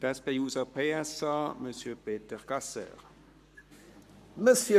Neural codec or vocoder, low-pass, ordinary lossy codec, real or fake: codec, 44.1 kHz, 7.8 kbps, Pupu-Codec; 14.4 kHz; none; fake